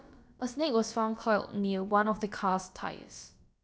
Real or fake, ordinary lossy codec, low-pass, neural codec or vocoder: fake; none; none; codec, 16 kHz, about 1 kbps, DyCAST, with the encoder's durations